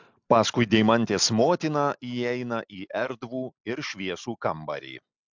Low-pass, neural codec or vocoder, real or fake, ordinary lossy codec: 7.2 kHz; none; real; MP3, 64 kbps